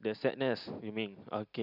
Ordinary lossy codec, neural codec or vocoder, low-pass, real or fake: none; none; 5.4 kHz; real